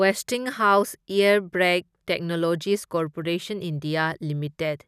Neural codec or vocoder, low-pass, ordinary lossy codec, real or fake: none; 14.4 kHz; none; real